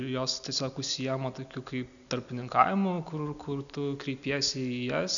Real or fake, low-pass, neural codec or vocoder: real; 7.2 kHz; none